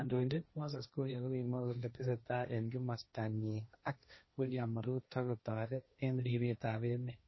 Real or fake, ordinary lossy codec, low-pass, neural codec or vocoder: fake; MP3, 24 kbps; 7.2 kHz; codec, 16 kHz, 1.1 kbps, Voila-Tokenizer